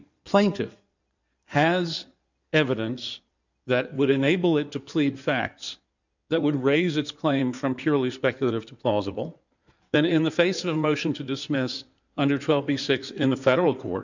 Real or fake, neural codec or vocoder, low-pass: fake; codec, 16 kHz in and 24 kHz out, 2.2 kbps, FireRedTTS-2 codec; 7.2 kHz